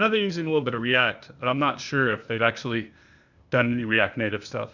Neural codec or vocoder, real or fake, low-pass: codec, 16 kHz, 0.8 kbps, ZipCodec; fake; 7.2 kHz